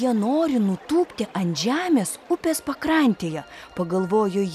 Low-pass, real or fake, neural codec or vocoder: 14.4 kHz; real; none